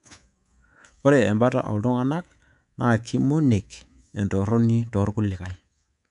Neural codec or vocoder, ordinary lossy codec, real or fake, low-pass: codec, 24 kHz, 3.1 kbps, DualCodec; none; fake; 10.8 kHz